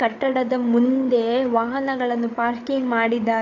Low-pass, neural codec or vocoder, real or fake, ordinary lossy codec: 7.2 kHz; codec, 16 kHz, 8 kbps, FreqCodec, larger model; fake; AAC, 48 kbps